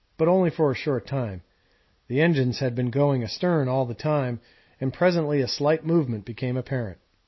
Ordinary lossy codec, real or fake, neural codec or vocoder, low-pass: MP3, 24 kbps; real; none; 7.2 kHz